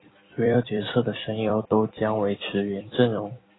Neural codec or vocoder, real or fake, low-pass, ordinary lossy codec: vocoder, 44.1 kHz, 128 mel bands every 256 samples, BigVGAN v2; fake; 7.2 kHz; AAC, 16 kbps